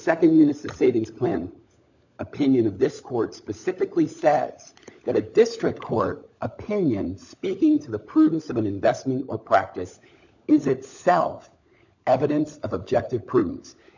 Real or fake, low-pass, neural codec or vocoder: fake; 7.2 kHz; codec, 16 kHz, 16 kbps, FunCodec, trained on LibriTTS, 50 frames a second